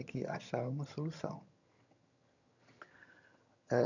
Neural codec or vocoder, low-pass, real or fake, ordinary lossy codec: vocoder, 22.05 kHz, 80 mel bands, HiFi-GAN; 7.2 kHz; fake; none